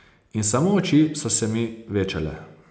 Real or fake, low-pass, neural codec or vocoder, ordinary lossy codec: real; none; none; none